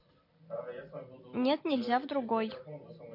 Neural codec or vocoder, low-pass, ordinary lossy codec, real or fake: none; 5.4 kHz; MP3, 32 kbps; real